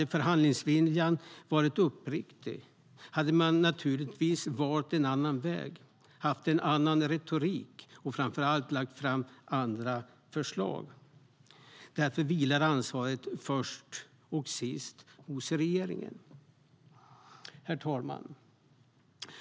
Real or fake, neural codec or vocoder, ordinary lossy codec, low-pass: real; none; none; none